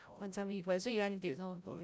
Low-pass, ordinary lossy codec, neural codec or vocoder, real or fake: none; none; codec, 16 kHz, 0.5 kbps, FreqCodec, larger model; fake